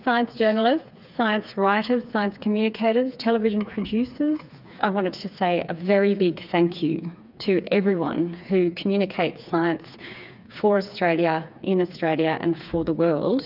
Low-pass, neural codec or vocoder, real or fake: 5.4 kHz; codec, 16 kHz, 4 kbps, FreqCodec, smaller model; fake